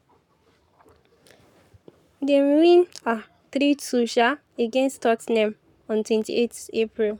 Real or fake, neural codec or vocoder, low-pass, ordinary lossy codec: fake; codec, 44.1 kHz, 7.8 kbps, Pupu-Codec; 19.8 kHz; none